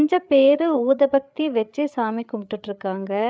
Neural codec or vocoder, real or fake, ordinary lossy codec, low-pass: codec, 16 kHz, 8 kbps, FreqCodec, larger model; fake; none; none